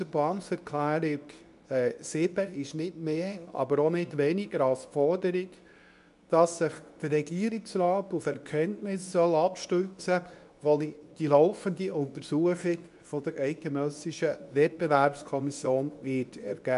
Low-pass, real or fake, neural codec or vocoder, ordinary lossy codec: 10.8 kHz; fake; codec, 24 kHz, 0.9 kbps, WavTokenizer, medium speech release version 2; none